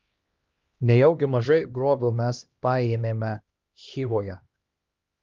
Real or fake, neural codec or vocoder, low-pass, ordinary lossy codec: fake; codec, 16 kHz, 1 kbps, X-Codec, HuBERT features, trained on LibriSpeech; 7.2 kHz; Opus, 32 kbps